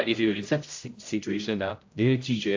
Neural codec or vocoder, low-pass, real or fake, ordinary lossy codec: codec, 16 kHz, 0.5 kbps, X-Codec, HuBERT features, trained on general audio; 7.2 kHz; fake; AAC, 48 kbps